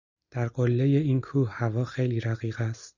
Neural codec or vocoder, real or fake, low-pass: none; real; 7.2 kHz